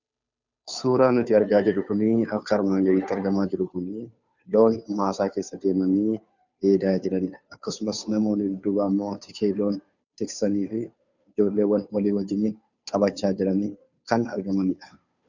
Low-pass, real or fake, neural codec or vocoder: 7.2 kHz; fake; codec, 16 kHz, 2 kbps, FunCodec, trained on Chinese and English, 25 frames a second